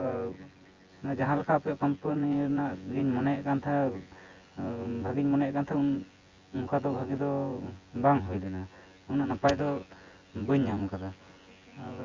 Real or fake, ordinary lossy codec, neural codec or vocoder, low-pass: fake; Opus, 32 kbps; vocoder, 24 kHz, 100 mel bands, Vocos; 7.2 kHz